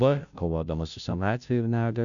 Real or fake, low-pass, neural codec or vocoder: fake; 7.2 kHz; codec, 16 kHz, 0.5 kbps, FunCodec, trained on Chinese and English, 25 frames a second